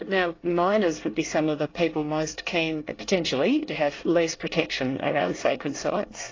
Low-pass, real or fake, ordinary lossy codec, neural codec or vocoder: 7.2 kHz; fake; AAC, 32 kbps; codec, 24 kHz, 1 kbps, SNAC